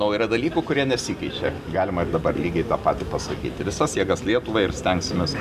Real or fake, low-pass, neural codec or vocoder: real; 14.4 kHz; none